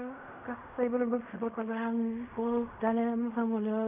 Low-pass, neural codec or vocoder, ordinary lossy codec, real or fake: 3.6 kHz; codec, 16 kHz in and 24 kHz out, 0.4 kbps, LongCat-Audio-Codec, fine tuned four codebook decoder; none; fake